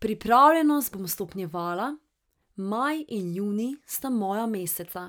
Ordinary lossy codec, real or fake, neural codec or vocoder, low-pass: none; real; none; none